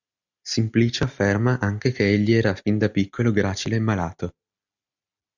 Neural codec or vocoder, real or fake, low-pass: none; real; 7.2 kHz